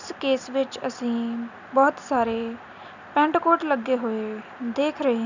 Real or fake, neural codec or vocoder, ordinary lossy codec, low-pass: real; none; none; 7.2 kHz